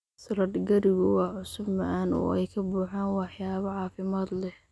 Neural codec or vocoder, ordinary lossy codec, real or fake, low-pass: none; none; real; none